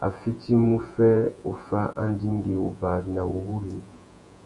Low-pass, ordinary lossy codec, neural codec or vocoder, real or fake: 10.8 kHz; MP3, 64 kbps; vocoder, 48 kHz, 128 mel bands, Vocos; fake